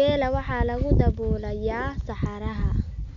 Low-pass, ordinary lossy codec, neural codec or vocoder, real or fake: 7.2 kHz; none; none; real